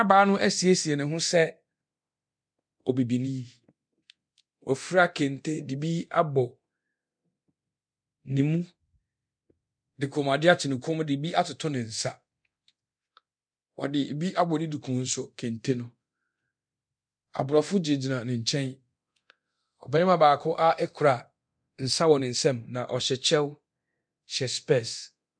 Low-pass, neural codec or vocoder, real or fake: 9.9 kHz; codec, 24 kHz, 0.9 kbps, DualCodec; fake